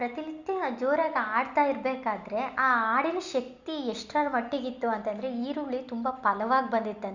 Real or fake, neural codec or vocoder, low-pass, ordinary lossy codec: real; none; 7.2 kHz; Opus, 64 kbps